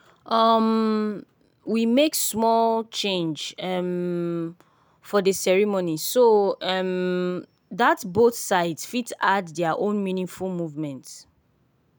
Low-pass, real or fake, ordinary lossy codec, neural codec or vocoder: none; real; none; none